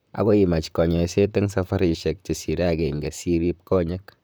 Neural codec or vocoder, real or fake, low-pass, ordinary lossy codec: vocoder, 44.1 kHz, 128 mel bands, Pupu-Vocoder; fake; none; none